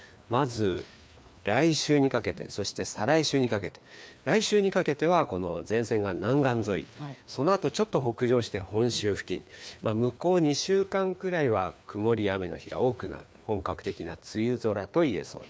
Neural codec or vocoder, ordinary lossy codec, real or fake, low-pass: codec, 16 kHz, 2 kbps, FreqCodec, larger model; none; fake; none